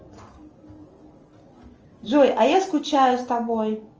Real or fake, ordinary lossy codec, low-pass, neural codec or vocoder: real; Opus, 24 kbps; 7.2 kHz; none